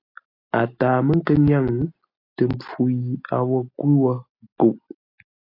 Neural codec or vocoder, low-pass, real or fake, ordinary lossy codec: none; 5.4 kHz; real; AAC, 32 kbps